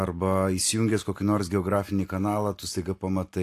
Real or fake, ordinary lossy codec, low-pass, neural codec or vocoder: fake; AAC, 64 kbps; 14.4 kHz; vocoder, 44.1 kHz, 128 mel bands every 256 samples, BigVGAN v2